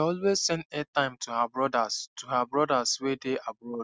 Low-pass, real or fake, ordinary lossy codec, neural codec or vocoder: none; real; none; none